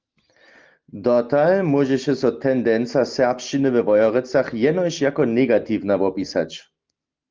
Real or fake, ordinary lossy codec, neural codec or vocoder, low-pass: real; Opus, 24 kbps; none; 7.2 kHz